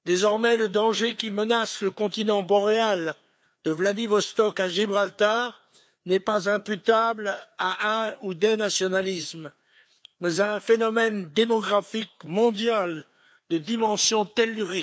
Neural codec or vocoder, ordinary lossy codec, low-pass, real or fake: codec, 16 kHz, 2 kbps, FreqCodec, larger model; none; none; fake